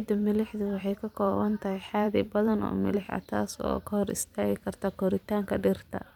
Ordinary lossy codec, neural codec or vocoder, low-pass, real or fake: none; vocoder, 44.1 kHz, 128 mel bands every 512 samples, BigVGAN v2; 19.8 kHz; fake